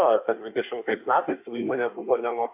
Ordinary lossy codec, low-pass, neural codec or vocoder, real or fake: AAC, 32 kbps; 3.6 kHz; codec, 24 kHz, 1 kbps, SNAC; fake